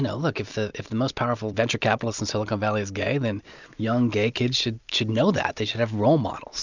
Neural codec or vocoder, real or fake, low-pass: none; real; 7.2 kHz